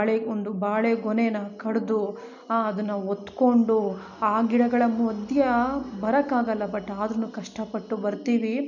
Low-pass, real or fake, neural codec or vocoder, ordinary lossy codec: 7.2 kHz; real; none; none